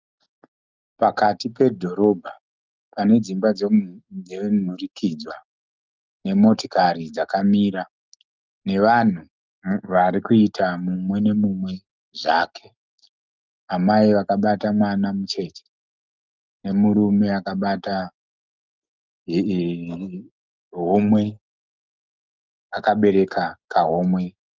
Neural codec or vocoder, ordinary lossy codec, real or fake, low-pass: none; Opus, 32 kbps; real; 7.2 kHz